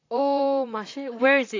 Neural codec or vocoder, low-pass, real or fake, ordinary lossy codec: vocoder, 44.1 kHz, 128 mel bands, Pupu-Vocoder; 7.2 kHz; fake; AAC, 48 kbps